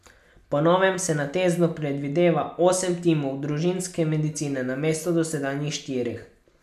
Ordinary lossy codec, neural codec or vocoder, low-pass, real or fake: none; none; 14.4 kHz; real